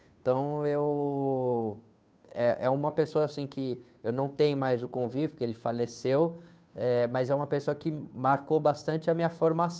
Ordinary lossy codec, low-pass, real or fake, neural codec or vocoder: none; none; fake; codec, 16 kHz, 2 kbps, FunCodec, trained on Chinese and English, 25 frames a second